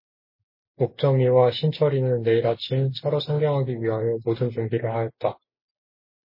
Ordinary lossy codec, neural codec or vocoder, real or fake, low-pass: MP3, 24 kbps; none; real; 5.4 kHz